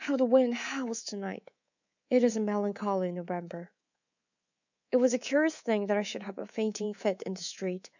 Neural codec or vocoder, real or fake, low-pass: codec, 24 kHz, 3.1 kbps, DualCodec; fake; 7.2 kHz